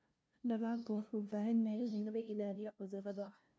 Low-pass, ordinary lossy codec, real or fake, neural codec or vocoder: none; none; fake; codec, 16 kHz, 0.5 kbps, FunCodec, trained on LibriTTS, 25 frames a second